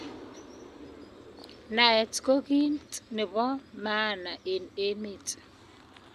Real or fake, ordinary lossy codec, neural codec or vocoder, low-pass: real; none; none; 14.4 kHz